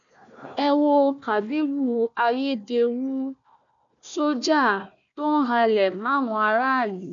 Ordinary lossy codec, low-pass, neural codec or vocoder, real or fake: none; 7.2 kHz; codec, 16 kHz, 1 kbps, FunCodec, trained on Chinese and English, 50 frames a second; fake